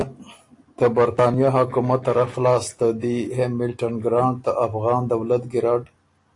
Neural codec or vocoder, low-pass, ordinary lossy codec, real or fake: none; 10.8 kHz; AAC, 48 kbps; real